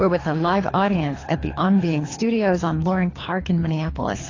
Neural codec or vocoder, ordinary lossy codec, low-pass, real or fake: codec, 24 kHz, 3 kbps, HILCodec; AAC, 32 kbps; 7.2 kHz; fake